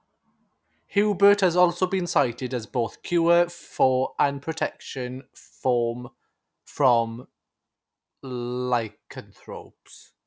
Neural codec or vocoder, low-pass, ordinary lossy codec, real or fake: none; none; none; real